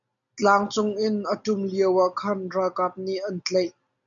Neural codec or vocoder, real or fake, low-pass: none; real; 7.2 kHz